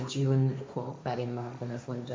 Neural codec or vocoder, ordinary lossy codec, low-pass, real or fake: codec, 16 kHz, 1.1 kbps, Voila-Tokenizer; none; none; fake